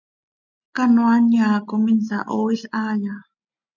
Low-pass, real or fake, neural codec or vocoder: 7.2 kHz; real; none